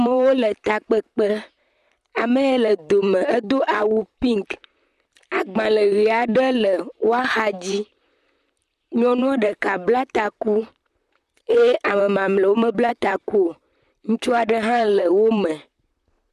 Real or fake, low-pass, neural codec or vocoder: fake; 14.4 kHz; vocoder, 44.1 kHz, 128 mel bands, Pupu-Vocoder